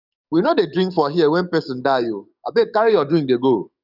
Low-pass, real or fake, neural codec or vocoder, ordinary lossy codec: 5.4 kHz; fake; codec, 44.1 kHz, 7.8 kbps, DAC; none